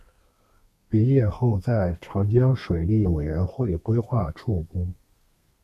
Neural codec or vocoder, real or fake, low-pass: codec, 32 kHz, 1.9 kbps, SNAC; fake; 14.4 kHz